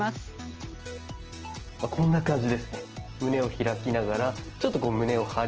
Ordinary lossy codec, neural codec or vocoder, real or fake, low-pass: Opus, 16 kbps; none; real; 7.2 kHz